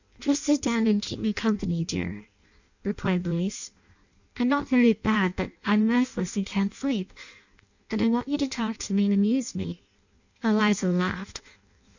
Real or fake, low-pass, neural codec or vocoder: fake; 7.2 kHz; codec, 16 kHz in and 24 kHz out, 0.6 kbps, FireRedTTS-2 codec